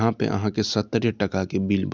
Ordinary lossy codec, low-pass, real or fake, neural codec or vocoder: none; none; real; none